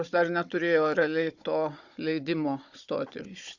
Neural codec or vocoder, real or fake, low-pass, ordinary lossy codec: codec, 16 kHz, 16 kbps, FunCodec, trained on Chinese and English, 50 frames a second; fake; 7.2 kHz; Opus, 64 kbps